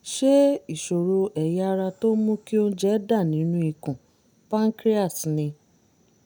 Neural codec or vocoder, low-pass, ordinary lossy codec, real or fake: none; none; none; real